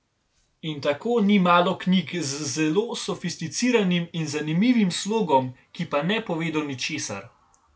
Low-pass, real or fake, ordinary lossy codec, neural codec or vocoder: none; real; none; none